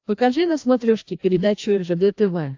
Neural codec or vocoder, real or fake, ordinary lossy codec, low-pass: codec, 16 kHz, 1 kbps, FreqCodec, larger model; fake; AAC, 48 kbps; 7.2 kHz